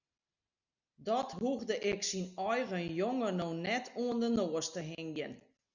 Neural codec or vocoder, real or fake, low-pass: none; real; 7.2 kHz